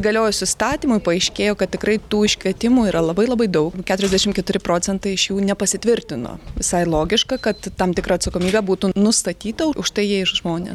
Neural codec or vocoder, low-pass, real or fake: none; 19.8 kHz; real